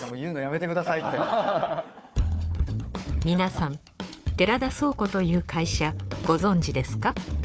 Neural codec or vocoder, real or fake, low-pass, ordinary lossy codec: codec, 16 kHz, 4 kbps, FunCodec, trained on Chinese and English, 50 frames a second; fake; none; none